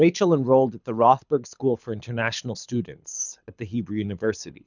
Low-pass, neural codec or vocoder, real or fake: 7.2 kHz; codec, 24 kHz, 6 kbps, HILCodec; fake